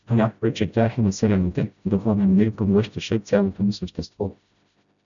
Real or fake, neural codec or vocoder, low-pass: fake; codec, 16 kHz, 0.5 kbps, FreqCodec, smaller model; 7.2 kHz